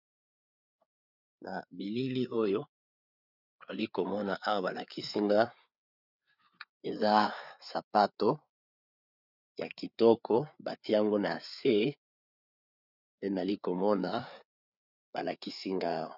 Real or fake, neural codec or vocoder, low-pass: fake; codec, 16 kHz, 4 kbps, FreqCodec, larger model; 5.4 kHz